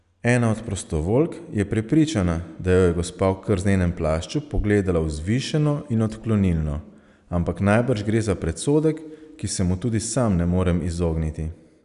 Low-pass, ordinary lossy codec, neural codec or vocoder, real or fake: 10.8 kHz; none; none; real